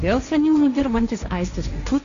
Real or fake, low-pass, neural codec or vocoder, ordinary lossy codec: fake; 7.2 kHz; codec, 16 kHz, 1.1 kbps, Voila-Tokenizer; Opus, 64 kbps